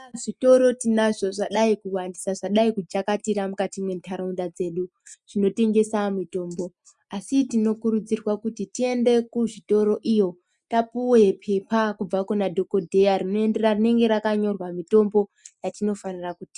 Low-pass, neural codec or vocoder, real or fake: 10.8 kHz; none; real